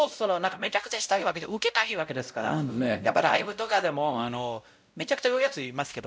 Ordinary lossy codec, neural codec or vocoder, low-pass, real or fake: none; codec, 16 kHz, 0.5 kbps, X-Codec, WavLM features, trained on Multilingual LibriSpeech; none; fake